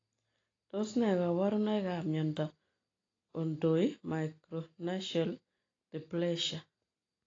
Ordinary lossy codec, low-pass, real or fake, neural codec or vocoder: AAC, 32 kbps; 7.2 kHz; real; none